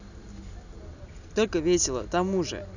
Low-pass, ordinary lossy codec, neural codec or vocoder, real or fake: 7.2 kHz; none; none; real